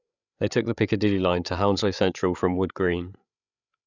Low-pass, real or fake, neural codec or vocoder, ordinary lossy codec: 7.2 kHz; fake; codec, 16 kHz, 8 kbps, FreqCodec, larger model; none